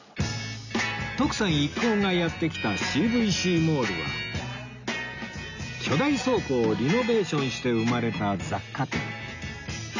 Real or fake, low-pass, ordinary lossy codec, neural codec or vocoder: real; 7.2 kHz; none; none